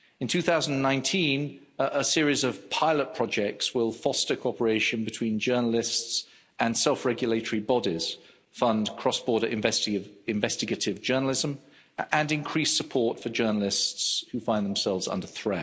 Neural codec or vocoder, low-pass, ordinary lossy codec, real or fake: none; none; none; real